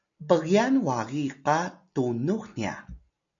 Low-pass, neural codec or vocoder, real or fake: 7.2 kHz; none; real